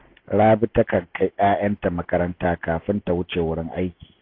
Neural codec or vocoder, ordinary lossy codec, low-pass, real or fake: none; AAC, 32 kbps; 5.4 kHz; real